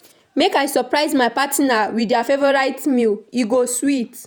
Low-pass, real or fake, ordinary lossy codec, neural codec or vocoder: none; fake; none; vocoder, 48 kHz, 128 mel bands, Vocos